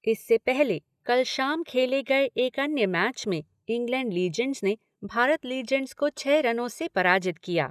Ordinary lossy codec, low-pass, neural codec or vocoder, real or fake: none; 14.4 kHz; none; real